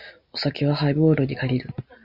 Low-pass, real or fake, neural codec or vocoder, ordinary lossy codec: 5.4 kHz; real; none; Opus, 64 kbps